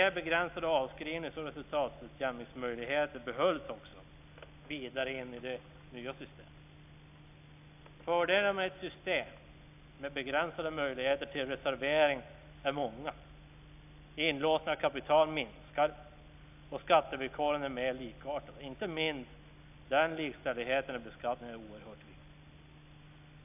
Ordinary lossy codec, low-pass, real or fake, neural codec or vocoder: AAC, 32 kbps; 3.6 kHz; real; none